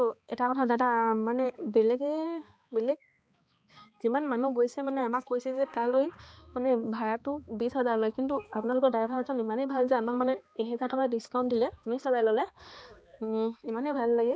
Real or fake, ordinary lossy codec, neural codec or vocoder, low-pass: fake; none; codec, 16 kHz, 2 kbps, X-Codec, HuBERT features, trained on balanced general audio; none